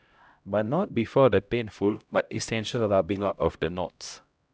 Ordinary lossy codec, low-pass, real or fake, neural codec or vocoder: none; none; fake; codec, 16 kHz, 0.5 kbps, X-Codec, HuBERT features, trained on LibriSpeech